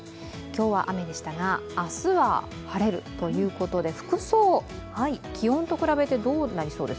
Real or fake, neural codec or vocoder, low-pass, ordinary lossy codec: real; none; none; none